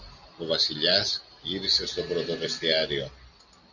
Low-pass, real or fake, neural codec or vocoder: 7.2 kHz; real; none